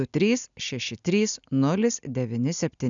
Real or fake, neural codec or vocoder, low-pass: real; none; 7.2 kHz